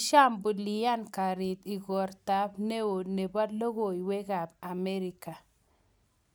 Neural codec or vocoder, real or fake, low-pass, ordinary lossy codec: none; real; none; none